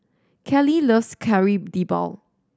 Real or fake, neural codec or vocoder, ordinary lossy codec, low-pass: real; none; none; none